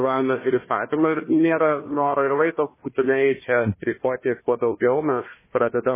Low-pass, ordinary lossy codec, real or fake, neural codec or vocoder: 3.6 kHz; MP3, 16 kbps; fake; codec, 16 kHz, 1 kbps, FunCodec, trained on Chinese and English, 50 frames a second